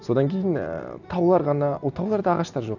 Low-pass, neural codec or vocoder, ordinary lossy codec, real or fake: 7.2 kHz; none; none; real